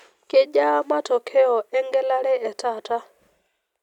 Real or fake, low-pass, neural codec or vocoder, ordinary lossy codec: real; 19.8 kHz; none; none